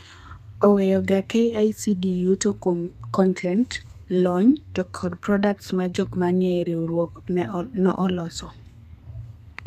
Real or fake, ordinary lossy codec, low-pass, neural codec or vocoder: fake; none; 14.4 kHz; codec, 32 kHz, 1.9 kbps, SNAC